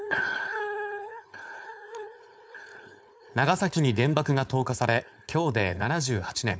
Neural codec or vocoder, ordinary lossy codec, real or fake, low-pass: codec, 16 kHz, 4.8 kbps, FACodec; none; fake; none